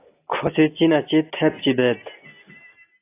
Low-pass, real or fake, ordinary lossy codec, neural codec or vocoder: 3.6 kHz; real; AAC, 24 kbps; none